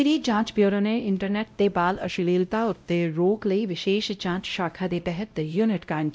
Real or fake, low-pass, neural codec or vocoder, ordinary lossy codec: fake; none; codec, 16 kHz, 0.5 kbps, X-Codec, WavLM features, trained on Multilingual LibriSpeech; none